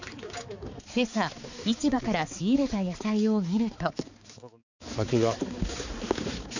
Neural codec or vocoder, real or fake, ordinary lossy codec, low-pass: codec, 16 kHz, 4 kbps, X-Codec, HuBERT features, trained on balanced general audio; fake; none; 7.2 kHz